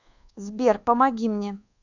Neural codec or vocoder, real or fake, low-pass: codec, 24 kHz, 1.2 kbps, DualCodec; fake; 7.2 kHz